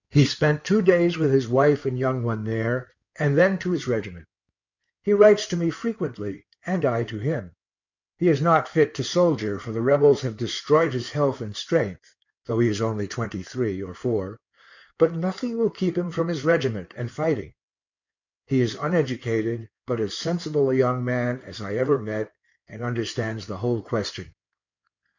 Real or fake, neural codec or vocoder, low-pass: fake; codec, 16 kHz in and 24 kHz out, 2.2 kbps, FireRedTTS-2 codec; 7.2 kHz